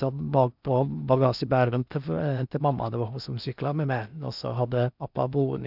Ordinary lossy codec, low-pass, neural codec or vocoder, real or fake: none; 5.4 kHz; codec, 16 kHz in and 24 kHz out, 0.8 kbps, FocalCodec, streaming, 65536 codes; fake